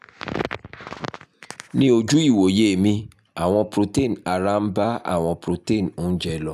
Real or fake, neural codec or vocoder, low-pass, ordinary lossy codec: real; none; 14.4 kHz; none